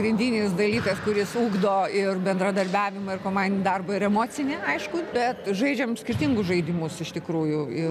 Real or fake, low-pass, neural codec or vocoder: real; 14.4 kHz; none